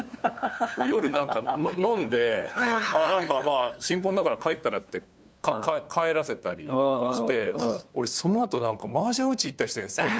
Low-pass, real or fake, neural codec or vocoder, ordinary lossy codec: none; fake; codec, 16 kHz, 2 kbps, FunCodec, trained on LibriTTS, 25 frames a second; none